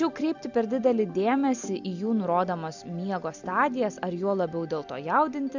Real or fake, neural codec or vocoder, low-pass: real; none; 7.2 kHz